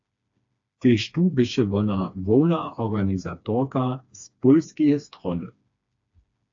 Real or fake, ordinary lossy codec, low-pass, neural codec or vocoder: fake; AAC, 48 kbps; 7.2 kHz; codec, 16 kHz, 2 kbps, FreqCodec, smaller model